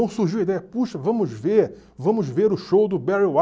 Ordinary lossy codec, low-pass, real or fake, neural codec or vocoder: none; none; real; none